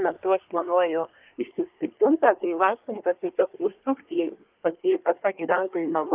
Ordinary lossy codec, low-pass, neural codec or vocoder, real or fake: Opus, 24 kbps; 3.6 kHz; codec, 24 kHz, 1 kbps, SNAC; fake